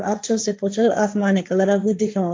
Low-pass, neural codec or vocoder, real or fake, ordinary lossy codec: none; codec, 16 kHz, 1.1 kbps, Voila-Tokenizer; fake; none